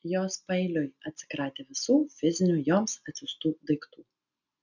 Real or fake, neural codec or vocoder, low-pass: real; none; 7.2 kHz